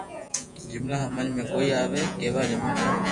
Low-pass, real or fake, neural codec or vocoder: 10.8 kHz; fake; vocoder, 48 kHz, 128 mel bands, Vocos